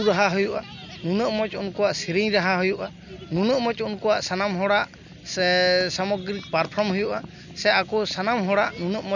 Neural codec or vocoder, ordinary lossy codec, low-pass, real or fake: none; none; 7.2 kHz; real